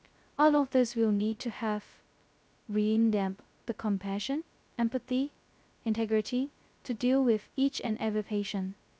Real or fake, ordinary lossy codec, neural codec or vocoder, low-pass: fake; none; codec, 16 kHz, 0.2 kbps, FocalCodec; none